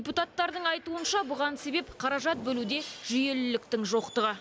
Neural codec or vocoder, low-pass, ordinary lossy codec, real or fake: none; none; none; real